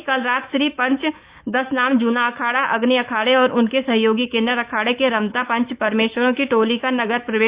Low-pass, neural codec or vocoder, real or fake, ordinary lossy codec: 3.6 kHz; autoencoder, 48 kHz, 128 numbers a frame, DAC-VAE, trained on Japanese speech; fake; Opus, 64 kbps